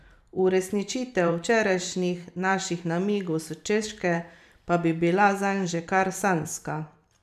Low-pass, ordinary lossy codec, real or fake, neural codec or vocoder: 14.4 kHz; none; fake; vocoder, 44.1 kHz, 128 mel bands every 512 samples, BigVGAN v2